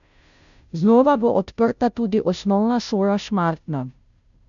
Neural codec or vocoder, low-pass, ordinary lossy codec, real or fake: codec, 16 kHz, 0.5 kbps, FunCodec, trained on Chinese and English, 25 frames a second; 7.2 kHz; none; fake